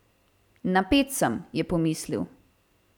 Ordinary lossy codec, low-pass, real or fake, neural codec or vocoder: none; 19.8 kHz; fake; vocoder, 44.1 kHz, 128 mel bands every 256 samples, BigVGAN v2